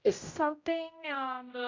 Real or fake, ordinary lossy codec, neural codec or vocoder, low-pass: fake; MP3, 64 kbps; codec, 16 kHz, 0.5 kbps, X-Codec, HuBERT features, trained on general audio; 7.2 kHz